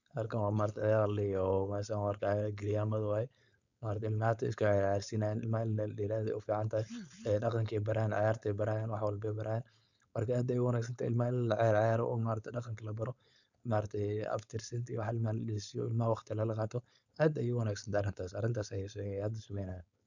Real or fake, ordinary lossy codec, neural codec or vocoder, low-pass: fake; none; codec, 16 kHz, 4.8 kbps, FACodec; 7.2 kHz